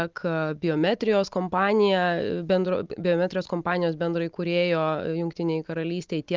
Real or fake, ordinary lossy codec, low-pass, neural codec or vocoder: real; Opus, 32 kbps; 7.2 kHz; none